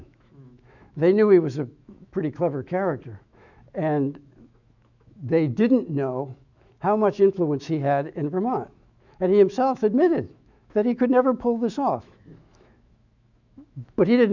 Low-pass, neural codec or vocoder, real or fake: 7.2 kHz; autoencoder, 48 kHz, 128 numbers a frame, DAC-VAE, trained on Japanese speech; fake